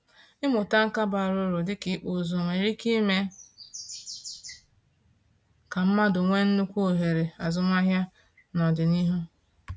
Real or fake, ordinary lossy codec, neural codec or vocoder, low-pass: real; none; none; none